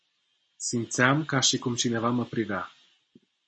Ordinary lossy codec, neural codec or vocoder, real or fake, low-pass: MP3, 32 kbps; none; real; 9.9 kHz